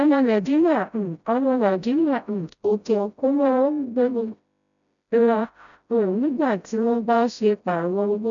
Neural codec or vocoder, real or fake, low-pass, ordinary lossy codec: codec, 16 kHz, 0.5 kbps, FreqCodec, smaller model; fake; 7.2 kHz; none